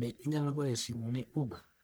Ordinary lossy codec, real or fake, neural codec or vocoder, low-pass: none; fake; codec, 44.1 kHz, 1.7 kbps, Pupu-Codec; none